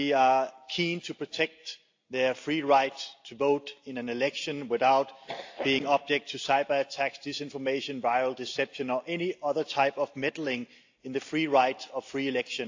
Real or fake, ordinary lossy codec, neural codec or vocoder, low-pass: real; AAC, 48 kbps; none; 7.2 kHz